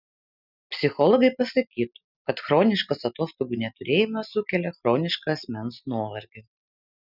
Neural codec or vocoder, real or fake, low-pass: none; real; 5.4 kHz